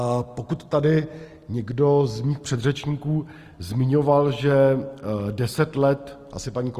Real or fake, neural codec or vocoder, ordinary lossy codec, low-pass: real; none; Opus, 24 kbps; 14.4 kHz